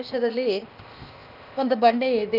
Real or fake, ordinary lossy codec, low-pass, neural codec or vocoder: fake; none; 5.4 kHz; codec, 16 kHz, 0.8 kbps, ZipCodec